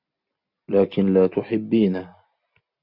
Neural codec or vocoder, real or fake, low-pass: none; real; 5.4 kHz